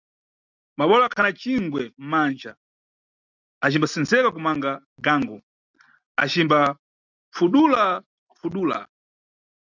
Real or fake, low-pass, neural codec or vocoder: real; 7.2 kHz; none